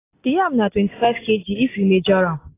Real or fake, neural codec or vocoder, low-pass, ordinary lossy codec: real; none; 3.6 kHz; AAC, 16 kbps